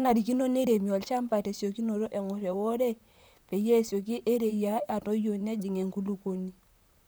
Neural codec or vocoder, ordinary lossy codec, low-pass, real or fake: vocoder, 44.1 kHz, 128 mel bands, Pupu-Vocoder; none; none; fake